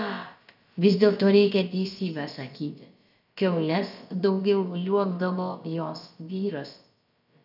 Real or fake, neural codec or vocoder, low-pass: fake; codec, 16 kHz, about 1 kbps, DyCAST, with the encoder's durations; 5.4 kHz